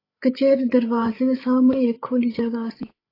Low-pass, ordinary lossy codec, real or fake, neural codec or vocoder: 5.4 kHz; AAC, 24 kbps; fake; codec, 16 kHz, 8 kbps, FreqCodec, larger model